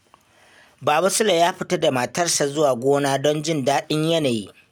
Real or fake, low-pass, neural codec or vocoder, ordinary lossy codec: real; none; none; none